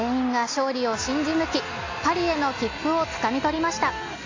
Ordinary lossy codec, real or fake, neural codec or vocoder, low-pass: AAC, 32 kbps; real; none; 7.2 kHz